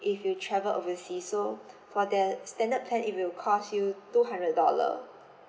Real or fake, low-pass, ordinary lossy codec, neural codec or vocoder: real; none; none; none